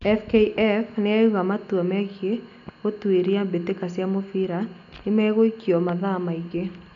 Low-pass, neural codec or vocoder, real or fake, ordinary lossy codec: 7.2 kHz; none; real; none